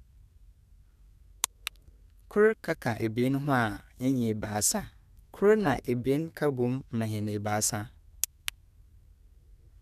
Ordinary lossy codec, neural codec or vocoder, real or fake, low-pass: none; codec, 32 kHz, 1.9 kbps, SNAC; fake; 14.4 kHz